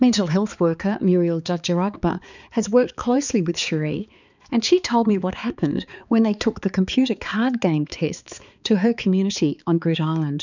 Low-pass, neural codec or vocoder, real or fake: 7.2 kHz; codec, 16 kHz, 4 kbps, X-Codec, HuBERT features, trained on balanced general audio; fake